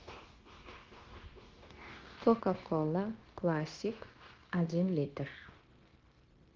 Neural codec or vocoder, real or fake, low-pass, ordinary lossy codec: codec, 16 kHz, 0.9 kbps, LongCat-Audio-Codec; fake; 7.2 kHz; Opus, 16 kbps